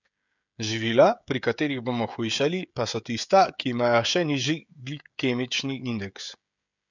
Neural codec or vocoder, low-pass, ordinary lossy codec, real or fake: codec, 16 kHz, 16 kbps, FreqCodec, smaller model; 7.2 kHz; none; fake